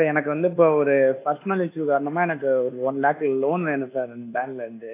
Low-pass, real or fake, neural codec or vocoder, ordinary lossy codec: 3.6 kHz; fake; codec, 24 kHz, 1.2 kbps, DualCodec; AAC, 24 kbps